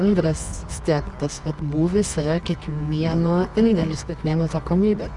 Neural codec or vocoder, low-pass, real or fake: codec, 24 kHz, 0.9 kbps, WavTokenizer, medium music audio release; 10.8 kHz; fake